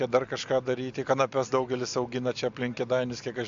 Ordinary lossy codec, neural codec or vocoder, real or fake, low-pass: AAC, 64 kbps; none; real; 7.2 kHz